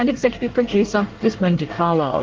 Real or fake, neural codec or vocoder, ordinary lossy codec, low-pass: fake; codec, 24 kHz, 1 kbps, SNAC; Opus, 16 kbps; 7.2 kHz